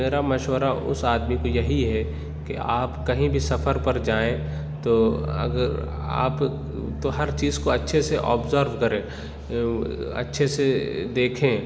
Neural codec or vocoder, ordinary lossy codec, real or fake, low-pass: none; none; real; none